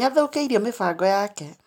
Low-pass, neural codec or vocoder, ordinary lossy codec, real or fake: 19.8 kHz; none; none; real